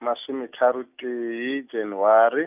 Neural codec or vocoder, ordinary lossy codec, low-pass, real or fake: none; none; 3.6 kHz; real